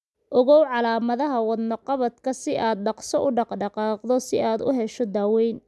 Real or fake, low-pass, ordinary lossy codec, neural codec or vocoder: real; none; none; none